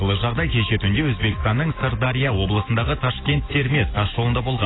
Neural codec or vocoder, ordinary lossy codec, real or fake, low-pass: none; AAC, 16 kbps; real; 7.2 kHz